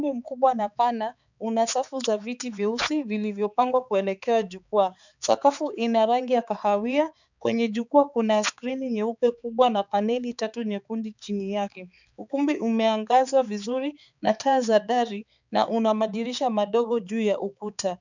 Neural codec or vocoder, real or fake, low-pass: codec, 16 kHz, 4 kbps, X-Codec, HuBERT features, trained on balanced general audio; fake; 7.2 kHz